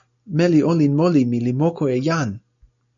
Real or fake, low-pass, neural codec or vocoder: real; 7.2 kHz; none